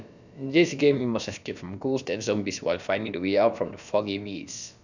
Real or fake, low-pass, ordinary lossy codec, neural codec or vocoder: fake; 7.2 kHz; none; codec, 16 kHz, about 1 kbps, DyCAST, with the encoder's durations